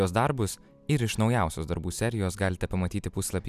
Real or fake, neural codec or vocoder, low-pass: real; none; 14.4 kHz